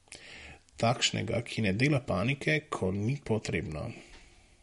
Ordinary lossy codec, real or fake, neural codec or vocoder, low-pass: MP3, 48 kbps; real; none; 19.8 kHz